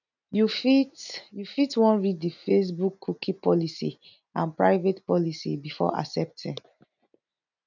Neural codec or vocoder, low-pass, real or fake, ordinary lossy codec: none; 7.2 kHz; real; none